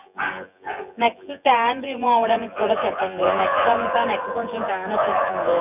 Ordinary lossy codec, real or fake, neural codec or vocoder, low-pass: none; fake; vocoder, 24 kHz, 100 mel bands, Vocos; 3.6 kHz